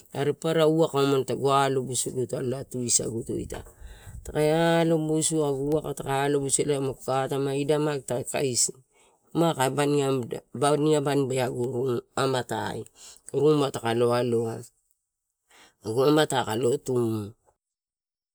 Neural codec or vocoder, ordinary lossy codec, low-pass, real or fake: none; none; none; real